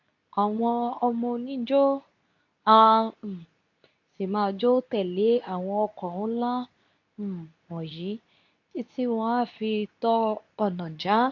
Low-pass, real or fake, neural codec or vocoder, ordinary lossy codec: 7.2 kHz; fake; codec, 24 kHz, 0.9 kbps, WavTokenizer, medium speech release version 2; AAC, 48 kbps